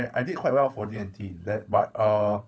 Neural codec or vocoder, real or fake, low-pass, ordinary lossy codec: codec, 16 kHz, 16 kbps, FunCodec, trained on LibriTTS, 50 frames a second; fake; none; none